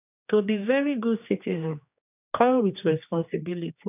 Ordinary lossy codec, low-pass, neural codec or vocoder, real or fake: none; 3.6 kHz; codec, 16 kHz, 2 kbps, X-Codec, HuBERT features, trained on general audio; fake